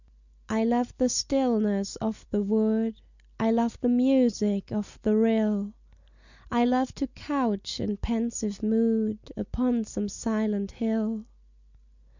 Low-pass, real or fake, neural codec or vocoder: 7.2 kHz; real; none